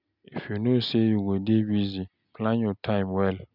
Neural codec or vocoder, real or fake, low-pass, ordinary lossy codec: none; real; 5.4 kHz; none